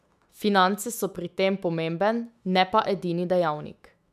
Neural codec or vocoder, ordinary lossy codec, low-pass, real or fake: autoencoder, 48 kHz, 128 numbers a frame, DAC-VAE, trained on Japanese speech; none; 14.4 kHz; fake